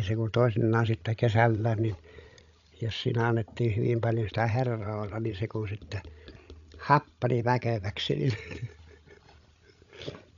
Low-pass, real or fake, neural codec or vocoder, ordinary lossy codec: 7.2 kHz; fake; codec, 16 kHz, 16 kbps, FreqCodec, larger model; none